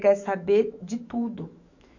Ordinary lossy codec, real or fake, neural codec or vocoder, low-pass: none; fake; autoencoder, 48 kHz, 128 numbers a frame, DAC-VAE, trained on Japanese speech; 7.2 kHz